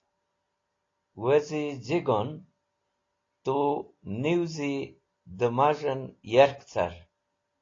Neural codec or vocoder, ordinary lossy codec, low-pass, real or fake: none; AAC, 32 kbps; 7.2 kHz; real